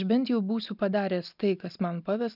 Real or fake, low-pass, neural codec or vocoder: real; 5.4 kHz; none